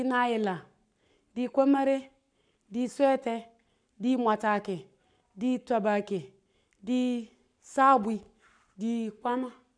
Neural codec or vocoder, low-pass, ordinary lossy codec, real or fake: codec, 44.1 kHz, 7.8 kbps, Pupu-Codec; 9.9 kHz; none; fake